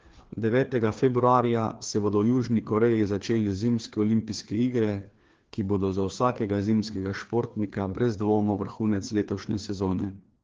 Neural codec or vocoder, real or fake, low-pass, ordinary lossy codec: codec, 16 kHz, 2 kbps, FreqCodec, larger model; fake; 7.2 kHz; Opus, 16 kbps